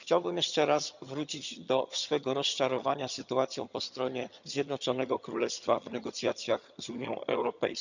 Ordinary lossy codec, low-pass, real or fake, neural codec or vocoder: none; 7.2 kHz; fake; vocoder, 22.05 kHz, 80 mel bands, HiFi-GAN